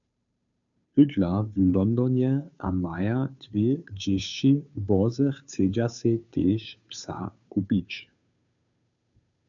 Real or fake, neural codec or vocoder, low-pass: fake; codec, 16 kHz, 2 kbps, FunCodec, trained on Chinese and English, 25 frames a second; 7.2 kHz